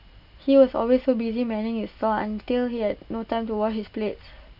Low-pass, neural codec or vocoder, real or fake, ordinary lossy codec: 5.4 kHz; none; real; none